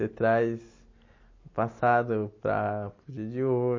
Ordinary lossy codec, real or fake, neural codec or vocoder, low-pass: MP3, 32 kbps; real; none; 7.2 kHz